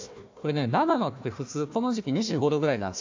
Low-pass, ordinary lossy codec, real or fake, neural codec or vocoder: 7.2 kHz; AAC, 48 kbps; fake; codec, 16 kHz, 1 kbps, FunCodec, trained on Chinese and English, 50 frames a second